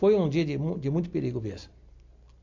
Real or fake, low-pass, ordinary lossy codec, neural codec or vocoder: real; 7.2 kHz; AAC, 48 kbps; none